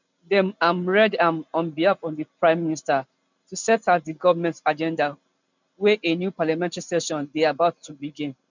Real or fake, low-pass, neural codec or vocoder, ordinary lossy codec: real; 7.2 kHz; none; none